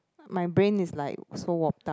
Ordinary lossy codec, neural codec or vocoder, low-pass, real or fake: none; none; none; real